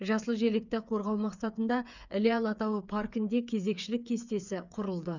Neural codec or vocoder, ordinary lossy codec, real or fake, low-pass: codec, 16 kHz, 8 kbps, FreqCodec, smaller model; none; fake; 7.2 kHz